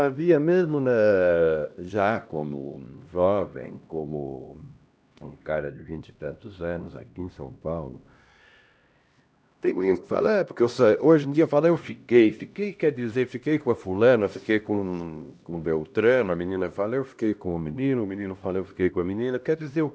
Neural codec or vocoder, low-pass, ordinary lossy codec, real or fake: codec, 16 kHz, 1 kbps, X-Codec, HuBERT features, trained on LibriSpeech; none; none; fake